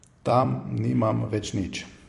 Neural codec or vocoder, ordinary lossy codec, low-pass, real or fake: vocoder, 44.1 kHz, 128 mel bands every 256 samples, BigVGAN v2; MP3, 48 kbps; 14.4 kHz; fake